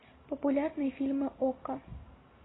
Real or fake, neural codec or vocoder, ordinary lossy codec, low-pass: real; none; AAC, 16 kbps; 7.2 kHz